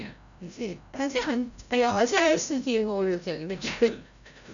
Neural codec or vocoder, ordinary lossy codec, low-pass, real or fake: codec, 16 kHz, 0.5 kbps, FreqCodec, larger model; none; 7.2 kHz; fake